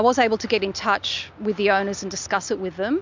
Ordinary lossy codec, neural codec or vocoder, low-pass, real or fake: MP3, 64 kbps; none; 7.2 kHz; real